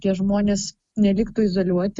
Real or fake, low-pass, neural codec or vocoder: real; 10.8 kHz; none